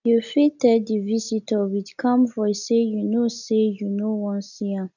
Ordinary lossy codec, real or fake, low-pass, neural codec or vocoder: none; real; 7.2 kHz; none